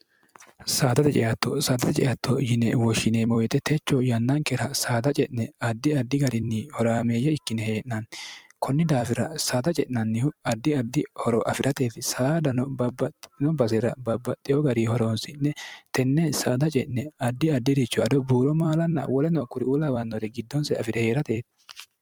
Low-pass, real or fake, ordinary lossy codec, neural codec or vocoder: 19.8 kHz; fake; MP3, 96 kbps; vocoder, 44.1 kHz, 128 mel bands every 256 samples, BigVGAN v2